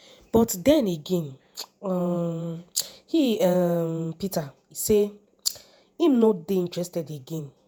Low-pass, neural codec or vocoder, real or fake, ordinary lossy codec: none; vocoder, 48 kHz, 128 mel bands, Vocos; fake; none